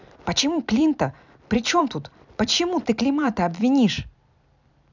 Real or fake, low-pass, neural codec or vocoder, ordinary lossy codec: fake; 7.2 kHz; vocoder, 44.1 kHz, 128 mel bands every 256 samples, BigVGAN v2; none